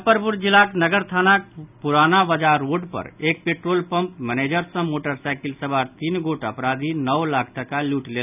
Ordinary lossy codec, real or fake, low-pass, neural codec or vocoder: none; real; 3.6 kHz; none